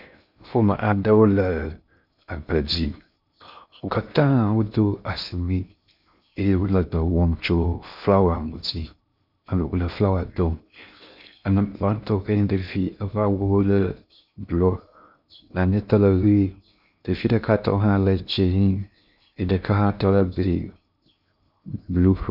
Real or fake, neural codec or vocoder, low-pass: fake; codec, 16 kHz in and 24 kHz out, 0.6 kbps, FocalCodec, streaming, 2048 codes; 5.4 kHz